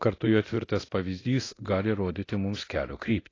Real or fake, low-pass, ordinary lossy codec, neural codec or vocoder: fake; 7.2 kHz; AAC, 32 kbps; codec, 24 kHz, 0.9 kbps, DualCodec